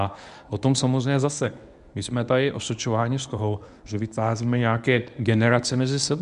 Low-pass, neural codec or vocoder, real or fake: 10.8 kHz; codec, 24 kHz, 0.9 kbps, WavTokenizer, medium speech release version 1; fake